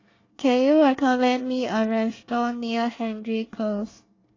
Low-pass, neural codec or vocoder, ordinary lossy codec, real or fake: 7.2 kHz; codec, 24 kHz, 1 kbps, SNAC; AAC, 32 kbps; fake